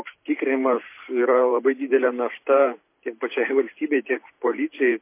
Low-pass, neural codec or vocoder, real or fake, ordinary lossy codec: 3.6 kHz; vocoder, 44.1 kHz, 128 mel bands every 512 samples, BigVGAN v2; fake; MP3, 24 kbps